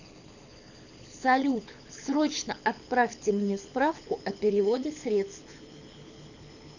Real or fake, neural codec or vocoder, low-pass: fake; codec, 24 kHz, 6 kbps, HILCodec; 7.2 kHz